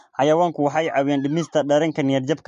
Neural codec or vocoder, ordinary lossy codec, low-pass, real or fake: none; MP3, 48 kbps; 10.8 kHz; real